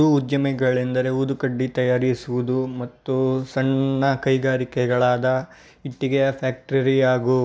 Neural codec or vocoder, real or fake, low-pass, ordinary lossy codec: none; real; none; none